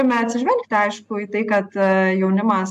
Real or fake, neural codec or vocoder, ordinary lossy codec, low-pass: real; none; AAC, 96 kbps; 14.4 kHz